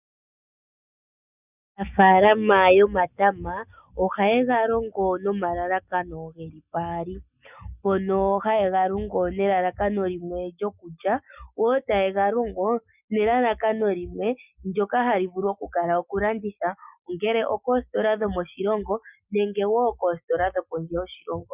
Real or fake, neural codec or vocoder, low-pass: real; none; 3.6 kHz